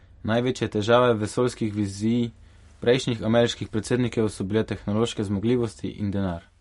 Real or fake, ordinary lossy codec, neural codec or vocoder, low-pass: real; MP3, 48 kbps; none; 14.4 kHz